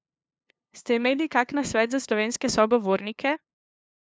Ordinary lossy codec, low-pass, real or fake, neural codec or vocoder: none; none; fake; codec, 16 kHz, 2 kbps, FunCodec, trained on LibriTTS, 25 frames a second